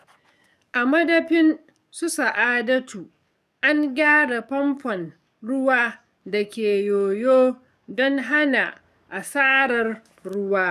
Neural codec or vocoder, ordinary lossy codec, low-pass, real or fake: codec, 44.1 kHz, 7.8 kbps, DAC; none; 14.4 kHz; fake